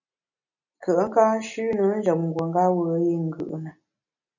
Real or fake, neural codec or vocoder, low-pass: real; none; 7.2 kHz